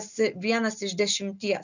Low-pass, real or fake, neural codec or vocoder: 7.2 kHz; real; none